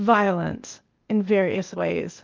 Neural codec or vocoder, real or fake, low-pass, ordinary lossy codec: codec, 16 kHz, 0.8 kbps, ZipCodec; fake; 7.2 kHz; Opus, 32 kbps